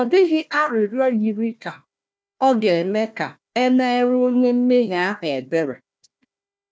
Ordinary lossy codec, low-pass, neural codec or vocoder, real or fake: none; none; codec, 16 kHz, 1 kbps, FunCodec, trained on Chinese and English, 50 frames a second; fake